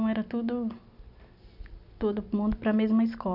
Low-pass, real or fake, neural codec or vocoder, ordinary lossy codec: 5.4 kHz; real; none; none